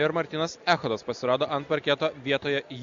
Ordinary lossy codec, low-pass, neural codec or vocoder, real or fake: Opus, 64 kbps; 7.2 kHz; none; real